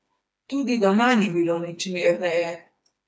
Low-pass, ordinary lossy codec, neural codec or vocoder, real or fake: none; none; codec, 16 kHz, 2 kbps, FreqCodec, smaller model; fake